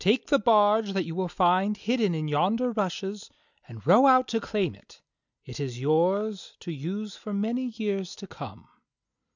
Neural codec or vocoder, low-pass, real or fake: none; 7.2 kHz; real